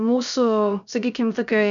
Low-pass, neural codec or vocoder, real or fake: 7.2 kHz; codec, 16 kHz, 0.3 kbps, FocalCodec; fake